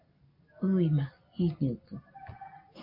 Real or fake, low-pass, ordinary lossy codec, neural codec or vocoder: real; 5.4 kHz; AAC, 48 kbps; none